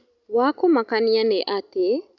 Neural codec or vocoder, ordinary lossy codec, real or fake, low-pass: none; none; real; 7.2 kHz